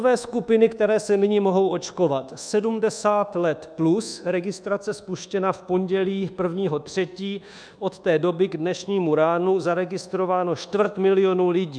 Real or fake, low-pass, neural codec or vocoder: fake; 9.9 kHz; codec, 24 kHz, 1.2 kbps, DualCodec